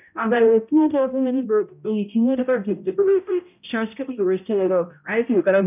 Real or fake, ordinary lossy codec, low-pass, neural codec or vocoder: fake; none; 3.6 kHz; codec, 16 kHz, 0.5 kbps, X-Codec, HuBERT features, trained on balanced general audio